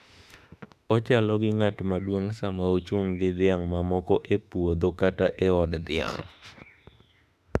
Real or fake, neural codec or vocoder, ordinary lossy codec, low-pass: fake; autoencoder, 48 kHz, 32 numbers a frame, DAC-VAE, trained on Japanese speech; none; 14.4 kHz